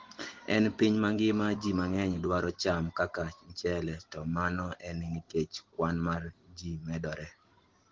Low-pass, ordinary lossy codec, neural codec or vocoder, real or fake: 7.2 kHz; Opus, 16 kbps; none; real